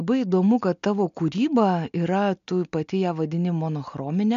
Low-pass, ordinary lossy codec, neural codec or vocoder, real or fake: 7.2 kHz; MP3, 64 kbps; none; real